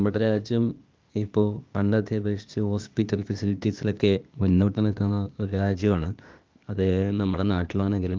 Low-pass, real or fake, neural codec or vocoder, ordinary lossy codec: 7.2 kHz; fake; codec, 16 kHz, 0.8 kbps, ZipCodec; Opus, 24 kbps